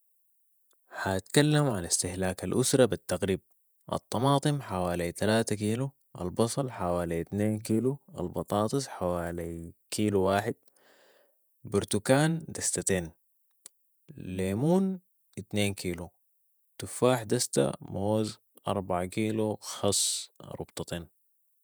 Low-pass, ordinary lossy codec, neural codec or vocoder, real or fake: none; none; vocoder, 48 kHz, 128 mel bands, Vocos; fake